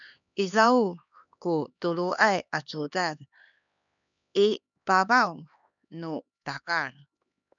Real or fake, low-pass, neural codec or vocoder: fake; 7.2 kHz; codec, 16 kHz, 2 kbps, X-Codec, HuBERT features, trained on LibriSpeech